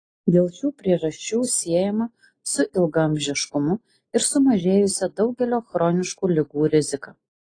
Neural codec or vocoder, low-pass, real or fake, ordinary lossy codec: none; 9.9 kHz; real; AAC, 32 kbps